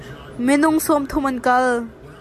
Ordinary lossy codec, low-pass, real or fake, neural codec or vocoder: AAC, 96 kbps; 14.4 kHz; real; none